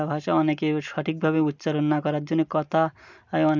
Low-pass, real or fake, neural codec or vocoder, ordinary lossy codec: 7.2 kHz; real; none; none